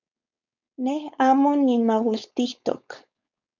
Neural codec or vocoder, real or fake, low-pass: codec, 16 kHz, 4.8 kbps, FACodec; fake; 7.2 kHz